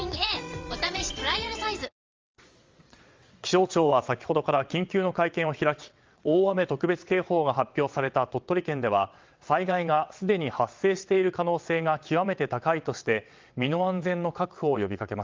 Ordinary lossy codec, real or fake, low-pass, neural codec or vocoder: Opus, 32 kbps; fake; 7.2 kHz; vocoder, 22.05 kHz, 80 mel bands, WaveNeXt